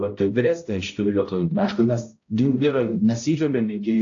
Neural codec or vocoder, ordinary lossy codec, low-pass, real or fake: codec, 16 kHz, 0.5 kbps, X-Codec, HuBERT features, trained on balanced general audio; AAC, 48 kbps; 7.2 kHz; fake